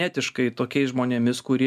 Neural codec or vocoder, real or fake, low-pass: none; real; 14.4 kHz